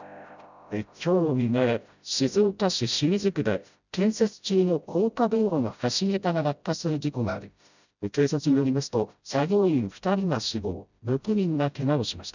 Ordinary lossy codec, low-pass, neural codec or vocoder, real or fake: none; 7.2 kHz; codec, 16 kHz, 0.5 kbps, FreqCodec, smaller model; fake